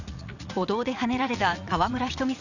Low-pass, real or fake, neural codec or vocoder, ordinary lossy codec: 7.2 kHz; fake; vocoder, 44.1 kHz, 80 mel bands, Vocos; none